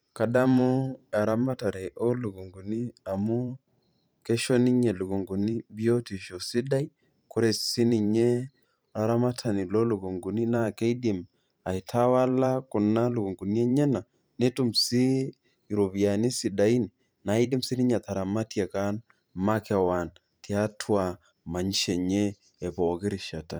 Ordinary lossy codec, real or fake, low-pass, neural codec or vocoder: none; fake; none; vocoder, 44.1 kHz, 128 mel bands every 512 samples, BigVGAN v2